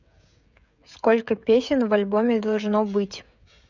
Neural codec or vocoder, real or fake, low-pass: codec, 16 kHz, 16 kbps, FreqCodec, smaller model; fake; 7.2 kHz